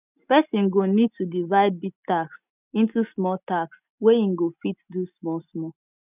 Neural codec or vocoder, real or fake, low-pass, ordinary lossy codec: none; real; 3.6 kHz; none